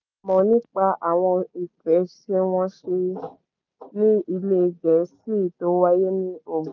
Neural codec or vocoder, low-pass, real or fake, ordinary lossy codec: none; 7.2 kHz; real; none